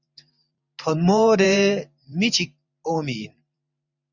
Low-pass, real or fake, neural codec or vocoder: 7.2 kHz; fake; vocoder, 44.1 kHz, 128 mel bands every 512 samples, BigVGAN v2